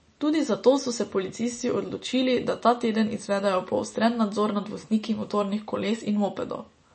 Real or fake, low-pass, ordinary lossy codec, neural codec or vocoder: real; 10.8 kHz; MP3, 32 kbps; none